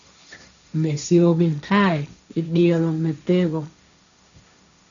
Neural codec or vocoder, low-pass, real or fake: codec, 16 kHz, 1.1 kbps, Voila-Tokenizer; 7.2 kHz; fake